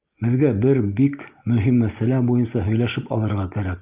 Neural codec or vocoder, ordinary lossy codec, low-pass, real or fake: none; Opus, 32 kbps; 3.6 kHz; real